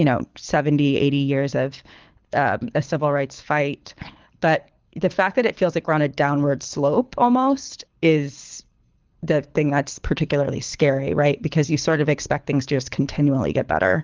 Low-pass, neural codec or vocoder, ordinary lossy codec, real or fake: 7.2 kHz; codec, 24 kHz, 6 kbps, HILCodec; Opus, 32 kbps; fake